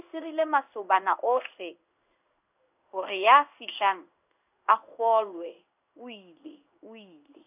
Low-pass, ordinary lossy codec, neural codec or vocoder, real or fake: 3.6 kHz; none; codec, 16 kHz in and 24 kHz out, 1 kbps, XY-Tokenizer; fake